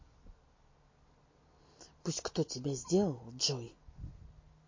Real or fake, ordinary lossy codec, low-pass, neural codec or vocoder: real; MP3, 32 kbps; 7.2 kHz; none